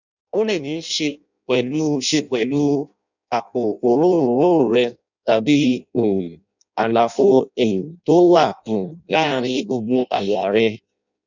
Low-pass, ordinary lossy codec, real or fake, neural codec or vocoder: 7.2 kHz; none; fake; codec, 16 kHz in and 24 kHz out, 0.6 kbps, FireRedTTS-2 codec